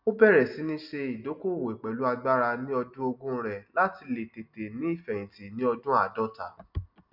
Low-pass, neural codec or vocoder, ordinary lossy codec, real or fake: 5.4 kHz; none; none; real